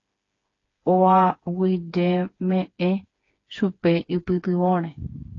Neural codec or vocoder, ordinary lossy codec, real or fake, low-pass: codec, 16 kHz, 4 kbps, FreqCodec, smaller model; AAC, 32 kbps; fake; 7.2 kHz